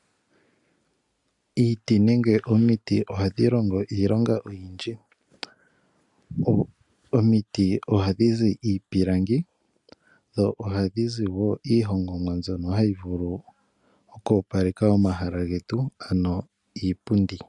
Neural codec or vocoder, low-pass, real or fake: none; 10.8 kHz; real